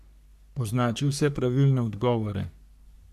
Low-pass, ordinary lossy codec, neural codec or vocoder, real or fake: 14.4 kHz; none; codec, 44.1 kHz, 3.4 kbps, Pupu-Codec; fake